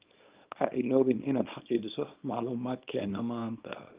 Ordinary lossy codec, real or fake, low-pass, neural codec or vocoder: Opus, 24 kbps; fake; 3.6 kHz; codec, 24 kHz, 0.9 kbps, WavTokenizer, small release